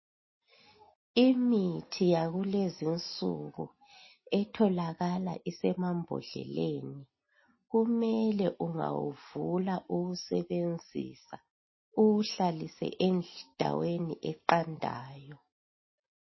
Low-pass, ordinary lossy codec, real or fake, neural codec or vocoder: 7.2 kHz; MP3, 24 kbps; real; none